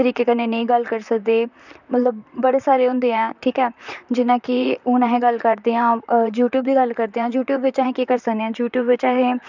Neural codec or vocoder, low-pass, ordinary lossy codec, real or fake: vocoder, 44.1 kHz, 128 mel bands, Pupu-Vocoder; 7.2 kHz; none; fake